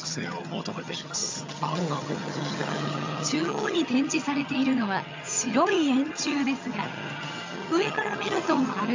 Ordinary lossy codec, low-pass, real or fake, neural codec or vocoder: MP3, 64 kbps; 7.2 kHz; fake; vocoder, 22.05 kHz, 80 mel bands, HiFi-GAN